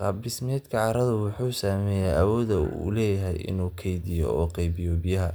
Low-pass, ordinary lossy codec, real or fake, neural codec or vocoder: none; none; real; none